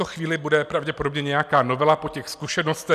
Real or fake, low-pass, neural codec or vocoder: real; 14.4 kHz; none